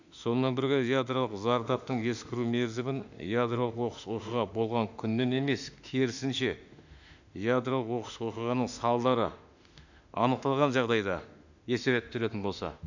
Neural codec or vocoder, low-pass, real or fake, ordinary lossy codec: autoencoder, 48 kHz, 32 numbers a frame, DAC-VAE, trained on Japanese speech; 7.2 kHz; fake; none